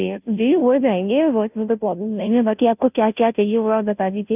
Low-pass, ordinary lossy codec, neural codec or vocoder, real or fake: 3.6 kHz; none; codec, 16 kHz, 0.5 kbps, FunCodec, trained on Chinese and English, 25 frames a second; fake